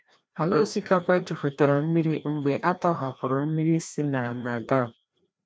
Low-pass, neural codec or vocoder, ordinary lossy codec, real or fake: none; codec, 16 kHz, 1 kbps, FreqCodec, larger model; none; fake